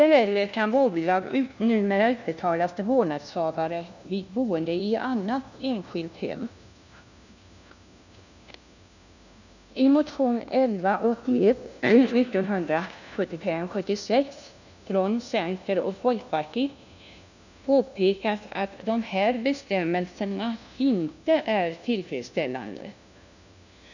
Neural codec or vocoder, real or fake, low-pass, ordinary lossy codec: codec, 16 kHz, 1 kbps, FunCodec, trained on LibriTTS, 50 frames a second; fake; 7.2 kHz; none